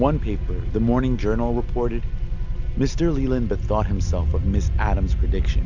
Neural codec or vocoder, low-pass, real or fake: none; 7.2 kHz; real